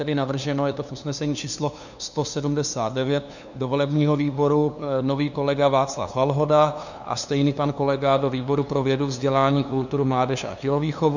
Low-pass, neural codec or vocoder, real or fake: 7.2 kHz; codec, 16 kHz, 2 kbps, FunCodec, trained on LibriTTS, 25 frames a second; fake